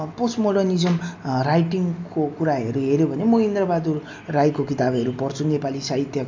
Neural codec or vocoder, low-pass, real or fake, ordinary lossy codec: none; 7.2 kHz; real; MP3, 64 kbps